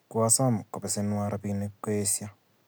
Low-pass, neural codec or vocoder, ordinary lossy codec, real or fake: none; none; none; real